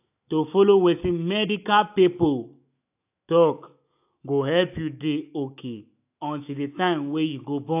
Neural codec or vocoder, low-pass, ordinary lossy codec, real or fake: autoencoder, 48 kHz, 128 numbers a frame, DAC-VAE, trained on Japanese speech; 3.6 kHz; AAC, 32 kbps; fake